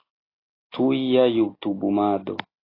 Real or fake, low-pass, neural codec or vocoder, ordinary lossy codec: real; 5.4 kHz; none; AAC, 24 kbps